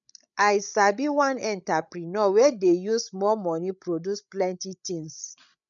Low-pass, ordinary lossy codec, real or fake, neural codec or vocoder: 7.2 kHz; none; fake; codec, 16 kHz, 16 kbps, FreqCodec, larger model